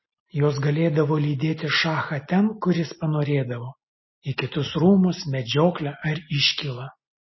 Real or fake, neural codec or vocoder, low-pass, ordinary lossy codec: real; none; 7.2 kHz; MP3, 24 kbps